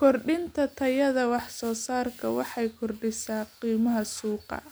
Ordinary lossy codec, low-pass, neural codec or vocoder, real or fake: none; none; none; real